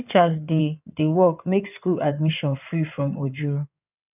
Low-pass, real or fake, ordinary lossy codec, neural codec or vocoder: 3.6 kHz; fake; none; codec, 16 kHz in and 24 kHz out, 2.2 kbps, FireRedTTS-2 codec